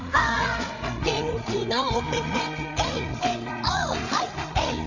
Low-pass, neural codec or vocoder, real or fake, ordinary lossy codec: 7.2 kHz; codec, 16 kHz, 8 kbps, FreqCodec, larger model; fake; none